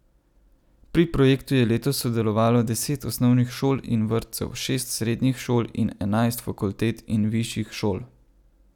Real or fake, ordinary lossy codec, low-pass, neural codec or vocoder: real; none; 19.8 kHz; none